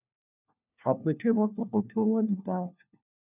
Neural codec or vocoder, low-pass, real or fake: codec, 16 kHz, 1 kbps, FunCodec, trained on LibriTTS, 50 frames a second; 3.6 kHz; fake